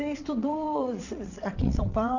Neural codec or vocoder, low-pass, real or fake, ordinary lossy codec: vocoder, 22.05 kHz, 80 mel bands, WaveNeXt; 7.2 kHz; fake; none